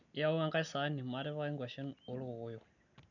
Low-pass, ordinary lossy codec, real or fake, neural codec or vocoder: 7.2 kHz; none; real; none